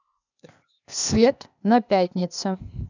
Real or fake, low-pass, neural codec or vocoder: fake; 7.2 kHz; codec, 16 kHz, 0.8 kbps, ZipCodec